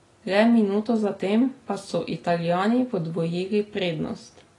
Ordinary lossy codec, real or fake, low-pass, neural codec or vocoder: AAC, 32 kbps; real; 10.8 kHz; none